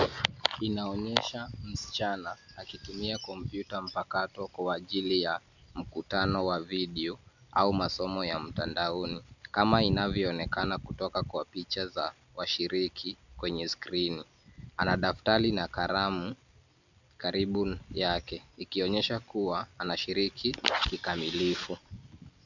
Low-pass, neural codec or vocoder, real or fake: 7.2 kHz; none; real